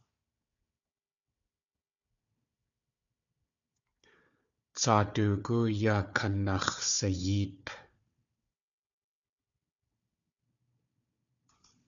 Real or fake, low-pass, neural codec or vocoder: fake; 7.2 kHz; codec, 16 kHz, 4 kbps, FunCodec, trained on Chinese and English, 50 frames a second